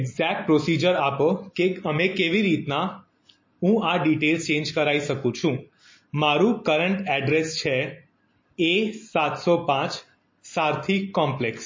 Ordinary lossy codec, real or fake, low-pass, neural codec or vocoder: MP3, 32 kbps; real; 7.2 kHz; none